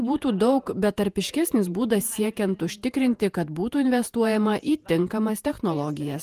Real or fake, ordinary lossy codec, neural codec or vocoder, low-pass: fake; Opus, 32 kbps; vocoder, 48 kHz, 128 mel bands, Vocos; 14.4 kHz